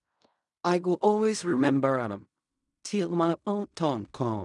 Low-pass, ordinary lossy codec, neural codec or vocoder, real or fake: 10.8 kHz; none; codec, 16 kHz in and 24 kHz out, 0.4 kbps, LongCat-Audio-Codec, fine tuned four codebook decoder; fake